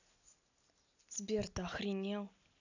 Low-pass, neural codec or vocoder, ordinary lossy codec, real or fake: 7.2 kHz; none; none; real